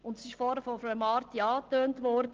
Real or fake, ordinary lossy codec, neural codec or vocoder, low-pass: real; Opus, 16 kbps; none; 7.2 kHz